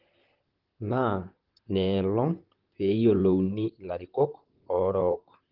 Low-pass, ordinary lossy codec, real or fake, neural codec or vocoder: 5.4 kHz; Opus, 16 kbps; fake; vocoder, 44.1 kHz, 128 mel bands, Pupu-Vocoder